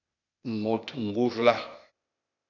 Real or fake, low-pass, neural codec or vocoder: fake; 7.2 kHz; codec, 16 kHz, 0.8 kbps, ZipCodec